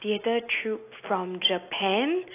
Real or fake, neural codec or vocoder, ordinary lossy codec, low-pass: real; none; AAC, 24 kbps; 3.6 kHz